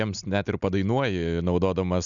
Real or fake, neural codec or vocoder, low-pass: real; none; 7.2 kHz